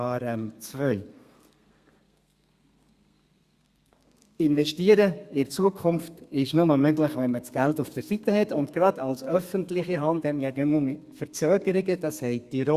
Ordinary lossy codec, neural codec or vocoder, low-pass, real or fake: Opus, 64 kbps; codec, 32 kHz, 1.9 kbps, SNAC; 14.4 kHz; fake